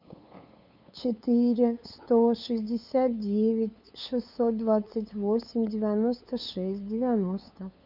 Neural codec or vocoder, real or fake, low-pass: codec, 16 kHz, 8 kbps, FunCodec, trained on LibriTTS, 25 frames a second; fake; 5.4 kHz